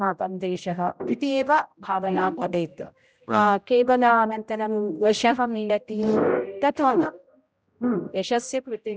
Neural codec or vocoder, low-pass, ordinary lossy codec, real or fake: codec, 16 kHz, 0.5 kbps, X-Codec, HuBERT features, trained on general audio; none; none; fake